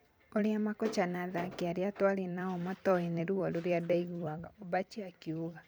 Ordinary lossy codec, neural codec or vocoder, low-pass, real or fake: none; vocoder, 44.1 kHz, 128 mel bands every 256 samples, BigVGAN v2; none; fake